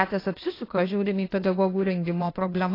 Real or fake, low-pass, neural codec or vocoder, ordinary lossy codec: fake; 5.4 kHz; codec, 16 kHz, 0.8 kbps, ZipCodec; AAC, 24 kbps